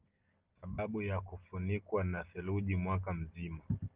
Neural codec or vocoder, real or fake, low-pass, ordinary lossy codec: none; real; 3.6 kHz; Opus, 24 kbps